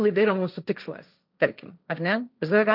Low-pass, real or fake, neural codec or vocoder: 5.4 kHz; fake; codec, 16 kHz, 1.1 kbps, Voila-Tokenizer